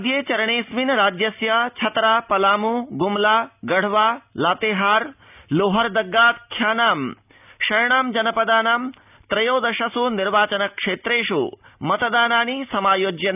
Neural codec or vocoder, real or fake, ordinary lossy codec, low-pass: none; real; none; 3.6 kHz